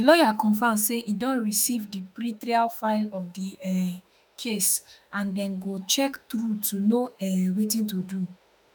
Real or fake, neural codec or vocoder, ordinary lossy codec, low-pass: fake; autoencoder, 48 kHz, 32 numbers a frame, DAC-VAE, trained on Japanese speech; none; none